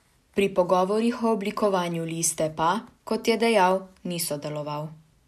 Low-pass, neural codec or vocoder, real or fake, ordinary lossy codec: 14.4 kHz; none; real; none